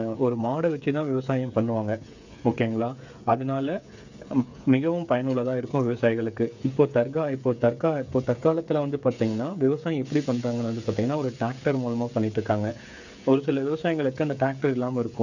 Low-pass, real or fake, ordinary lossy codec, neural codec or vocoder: 7.2 kHz; fake; none; codec, 16 kHz, 8 kbps, FreqCodec, smaller model